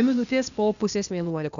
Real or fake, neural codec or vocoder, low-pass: fake; codec, 16 kHz, 0.8 kbps, ZipCodec; 7.2 kHz